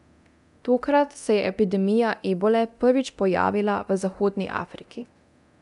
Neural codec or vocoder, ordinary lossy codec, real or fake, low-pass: codec, 24 kHz, 0.9 kbps, DualCodec; none; fake; 10.8 kHz